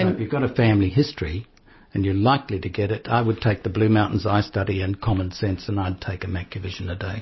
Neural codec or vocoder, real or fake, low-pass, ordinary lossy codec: none; real; 7.2 kHz; MP3, 24 kbps